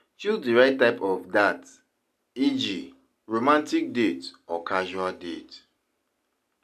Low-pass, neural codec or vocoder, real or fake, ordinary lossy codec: 14.4 kHz; none; real; none